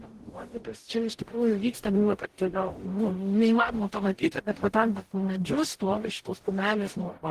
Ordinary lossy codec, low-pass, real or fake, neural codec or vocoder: Opus, 16 kbps; 14.4 kHz; fake; codec, 44.1 kHz, 0.9 kbps, DAC